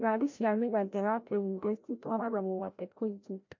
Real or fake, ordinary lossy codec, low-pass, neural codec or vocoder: fake; MP3, 48 kbps; 7.2 kHz; codec, 16 kHz, 0.5 kbps, FreqCodec, larger model